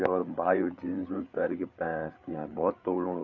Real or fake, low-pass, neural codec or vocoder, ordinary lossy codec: fake; 7.2 kHz; codec, 16 kHz, 8 kbps, FreqCodec, larger model; none